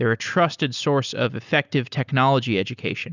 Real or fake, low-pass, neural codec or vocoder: real; 7.2 kHz; none